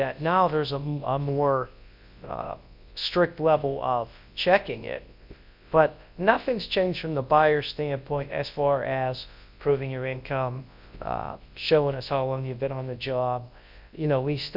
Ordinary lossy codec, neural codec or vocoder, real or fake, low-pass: MP3, 48 kbps; codec, 24 kHz, 0.9 kbps, WavTokenizer, large speech release; fake; 5.4 kHz